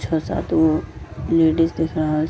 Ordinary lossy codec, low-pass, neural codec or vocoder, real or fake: none; none; none; real